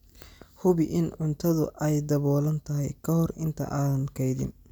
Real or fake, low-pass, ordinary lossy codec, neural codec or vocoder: real; none; none; none